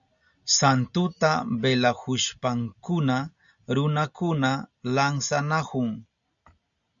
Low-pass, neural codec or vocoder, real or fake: 7.2 kHz; none; real